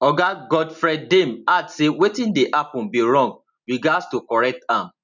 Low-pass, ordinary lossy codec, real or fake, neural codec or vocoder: 7.2 kHz; none; real; none